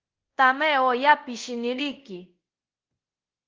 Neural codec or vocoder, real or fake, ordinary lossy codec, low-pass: codec, 24 kHz, 0.5 kbps, DualCodec; fake; Opus, 16 kbps; 7.2 kHz